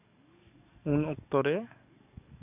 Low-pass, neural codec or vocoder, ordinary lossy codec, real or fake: 3.6 kHz; codec, 44.1 kHz, 7.8 kbps, DAC; none; fake